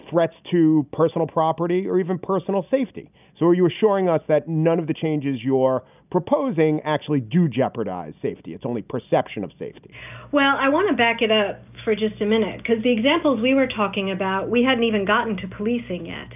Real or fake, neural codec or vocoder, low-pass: real; none; 3.6 kHz